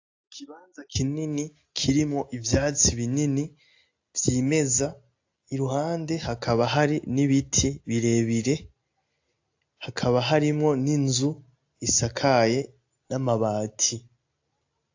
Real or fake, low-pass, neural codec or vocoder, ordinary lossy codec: real; 7.2 kHz; none; AAC, 48 kbps